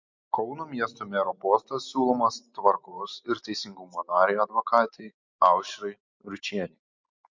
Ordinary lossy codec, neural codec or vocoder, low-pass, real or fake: MP3, 48 kbps; none; 7.2 kHz; real